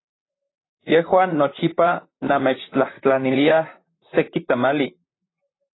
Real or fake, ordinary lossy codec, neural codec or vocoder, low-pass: fake; AAC, 16 kbps; vocoder, 44.1 kHz, 128 mel bands, Pupu-Vocoder; 7.2 kHz